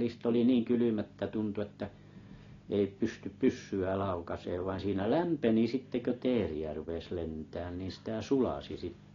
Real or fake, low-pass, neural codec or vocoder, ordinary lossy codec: real; 7.2 kHz; none; AAC, 32 kbps